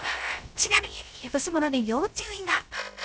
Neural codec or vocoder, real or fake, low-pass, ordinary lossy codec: codec, 16 kHz, 0.3 kbps, FocalCodec; fake; none; none